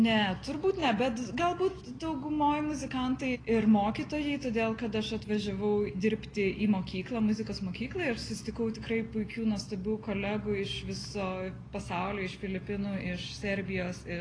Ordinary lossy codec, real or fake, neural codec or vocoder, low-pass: AAC, 32 kbps; real; none; 9.9 kHz